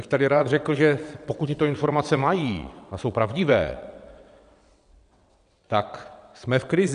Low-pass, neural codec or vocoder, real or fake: 9.9 kHz; vocoder, 22.05 kHz, 80 mel bands, WaveNeXt; fake